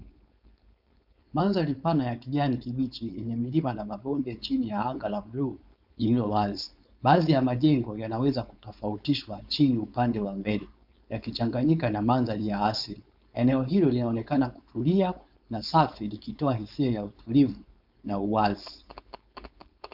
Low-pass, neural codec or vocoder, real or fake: 5.4 kHz; codec, 16 kHz, 4.8 kbps, FACodec; fake